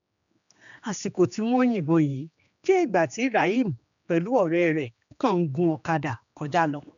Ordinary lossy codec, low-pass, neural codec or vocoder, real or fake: none; 7.2 kHz; codec, 16 kHz, 2 kbps, X-Codec, HuBERT features, trained on general audio; fake